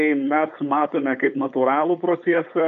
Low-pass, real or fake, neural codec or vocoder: 7.2 kHz; fake; codec, 16 kHz, 4.8 kbps, FACodec